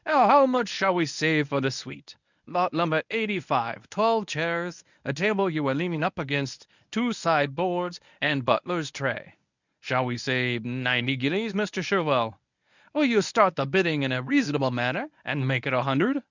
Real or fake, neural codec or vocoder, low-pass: fake; codec, 24 kHz, 0.9 kbps, WavTokenizer, medium speech release version 1; 7.2 kHz